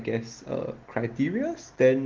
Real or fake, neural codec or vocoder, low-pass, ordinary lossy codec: real; none; 7.2 kHz; Opus, 16 kbps